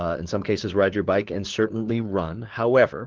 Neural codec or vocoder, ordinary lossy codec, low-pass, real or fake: codec, 16 kHz, 4 kbps, FunCodec, trained on LibriTTS, 50 frames a second; Opus, 16 kbps; 7.2 kHz; fake